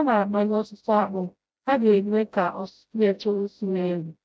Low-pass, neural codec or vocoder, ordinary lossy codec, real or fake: none; codec, 16 kHz, 0.5 kbps, FreqCodec, smaller model; none; fake